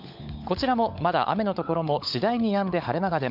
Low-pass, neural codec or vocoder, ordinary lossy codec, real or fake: 5.4 kHz; codec, 16 kHz, 16 kbps, FunCodec, trained on LibriTTS, 50 frames a second; none; fake